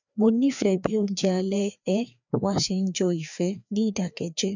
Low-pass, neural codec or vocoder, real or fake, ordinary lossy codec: 7.2 kHz; codec, 16 kHz, 2 kbps, FreqCodec, larger model; fake; none